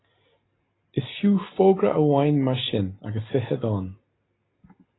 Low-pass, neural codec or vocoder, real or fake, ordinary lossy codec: 7.2 kHz; none; real; AAC, 16 kbps